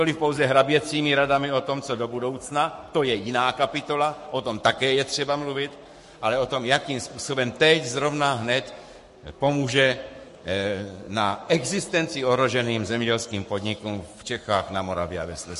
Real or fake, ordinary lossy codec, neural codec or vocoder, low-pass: fake; MP3, 48 kbps; codec, 44.1 kHz, 7.8 kbps, Pupu-Codec; 14.4 kHz